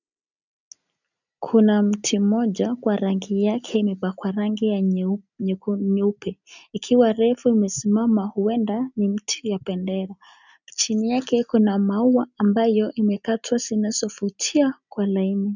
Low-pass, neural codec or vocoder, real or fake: 7.2 kHz; none; real